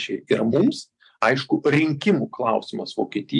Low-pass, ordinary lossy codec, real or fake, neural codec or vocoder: 10.8 kHz; MP3, 64 kbps; fake; vocoder, 44.1 kHz, 128 mel bands every 512 samples, BigVGAN v2